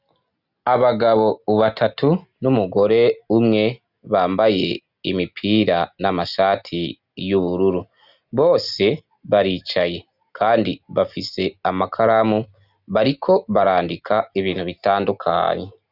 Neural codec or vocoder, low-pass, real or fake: none; 5.4 kHz; real